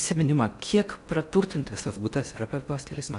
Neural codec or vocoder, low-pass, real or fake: codec, 16 kHz in and 24 kHz out, 0.6 kbps, FocalCodec, streaming, 4096 codes; 10.8 kHz; fake